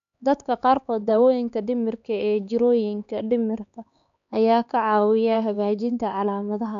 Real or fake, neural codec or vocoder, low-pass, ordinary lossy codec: fake; codec, 16 kHz, 4 kbps, X-Codec, HuBERT features, trained on LibriSpeech; 7.2 kHz; none